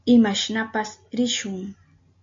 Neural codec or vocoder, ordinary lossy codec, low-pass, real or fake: none; MP3, 48 kbps; 7.2 kHz; real